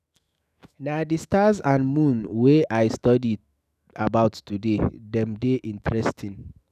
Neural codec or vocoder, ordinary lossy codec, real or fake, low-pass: autoencoder, 48 kHz, 128 numbers a frame, DAC-VAE, trained on Japanese speech; none; fake; 14.4 kHz